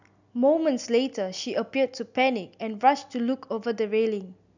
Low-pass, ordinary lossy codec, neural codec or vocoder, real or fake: 7.2 kHz; none; none; real